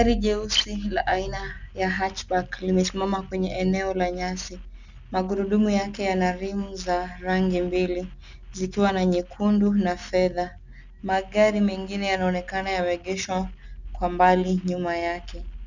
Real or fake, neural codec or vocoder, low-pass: real; none; 7.2 kHz